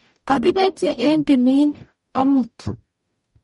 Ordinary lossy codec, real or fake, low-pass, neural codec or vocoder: MP3, 48 kbps; fake; 19.8 kHz; codec, 44.1 kHz, 0.9 kbps, DAC